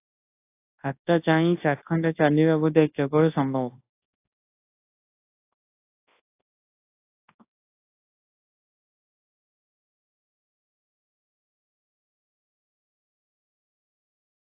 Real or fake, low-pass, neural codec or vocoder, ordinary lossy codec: fake; 3.6 kHz; codec, 24 kHz, 0.9 kbps, WavTokenizer, large speech release; AAC, 24 kbps